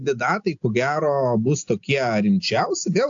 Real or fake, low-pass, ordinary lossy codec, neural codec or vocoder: real; 7.2 kHz; AAC, 64 kbps; none